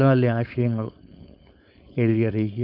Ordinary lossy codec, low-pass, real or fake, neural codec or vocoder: none; 5.4 kHz; fake; codec, 16 kHz, 4.8 kbps, FACodec